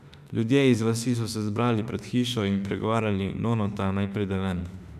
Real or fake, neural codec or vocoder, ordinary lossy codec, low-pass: fake; autoencoder, 48 kHz, 32 numbers a frame, DAC-VAE, trained on Japanese speech; none; 14.4 kHz